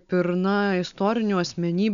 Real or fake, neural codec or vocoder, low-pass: real; none; 7.2 kHz